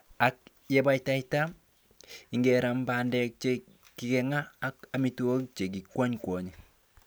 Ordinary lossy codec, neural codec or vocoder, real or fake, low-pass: none; none; real; none